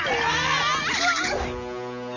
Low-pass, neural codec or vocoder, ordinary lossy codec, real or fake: 7.2 kHz; none; none; real